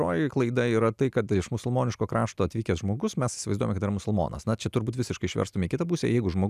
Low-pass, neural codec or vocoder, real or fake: 14.4 kHz; none; real